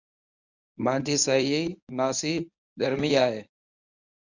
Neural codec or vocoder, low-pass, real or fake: codec, 24 kHz, 0.9 kbps, WavTokenizer, medium speech release version 1; 7.2 kHz; fake